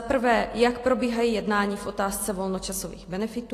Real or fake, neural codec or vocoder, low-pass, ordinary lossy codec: real; none; 14.4 kHz; AAC, 48 kbps